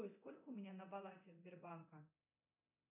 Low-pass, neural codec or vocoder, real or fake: 3.6 kHz; codec, 24 kHz, 0.9 kbps, DualCodec; fake